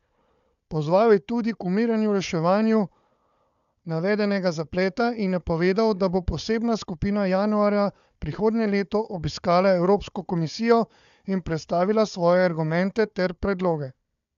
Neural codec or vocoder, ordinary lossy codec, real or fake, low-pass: codec, 16 kHz, 4 kbps, FunCodec, trained on Chinese and English, 50 frames a second; AAC, 96 kbps; fake; 7.2 kHz